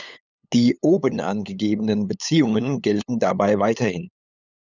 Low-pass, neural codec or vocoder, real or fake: 7.2 kHz; codec, 16 kHz, 8 kbps, FunCodec, trained on LibriTTS, 25 frames a second; fake